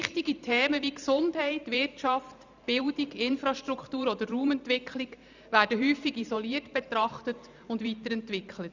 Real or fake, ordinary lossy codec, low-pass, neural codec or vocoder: fake; none; 7.2 kHz; vocoder, 44.1 kHz, 128 mel bands every 512 samples, BigVGAN v2